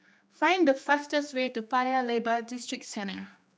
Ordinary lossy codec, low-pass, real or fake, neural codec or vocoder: none; none; fake; codec, 16 kHz, 2 kbps, X-Codec, HuBERT features, trained on general audio